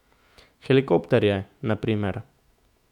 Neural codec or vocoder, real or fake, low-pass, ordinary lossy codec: autoencoder, 48 kHz, 128 numbers a frame, DAC-VAE, trained on Japanese speech; fake; 19.8 kHz; none